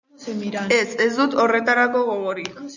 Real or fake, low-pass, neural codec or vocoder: real; 7.2 kHz; none